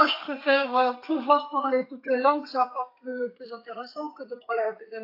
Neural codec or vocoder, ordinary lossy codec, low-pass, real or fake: codec, 32 kHz, 1.9 kbps, SNAC; AAC, 32 kbps; 5.4 kHz; fake